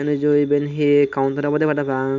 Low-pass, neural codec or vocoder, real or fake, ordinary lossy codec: 7.2 kHz; none; real; none